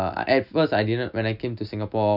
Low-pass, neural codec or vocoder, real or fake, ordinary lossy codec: 5.4 kHz; none; real; none